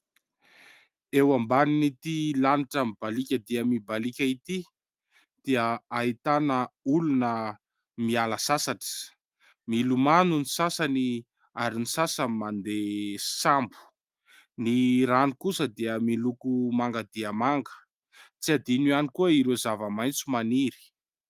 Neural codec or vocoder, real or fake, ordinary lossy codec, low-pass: none; real; Opus, 32 kbps; 14.4 kHz